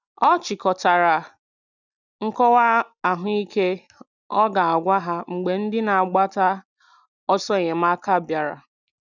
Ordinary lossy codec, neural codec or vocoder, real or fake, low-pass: none; none; real; 7.2 kHz